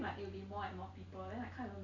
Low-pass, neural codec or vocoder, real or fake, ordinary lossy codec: 7.2 kHz; none; real; MP3, 64 kbps